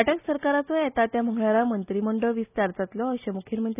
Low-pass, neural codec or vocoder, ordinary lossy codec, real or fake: 3.6 kHz; none; none; real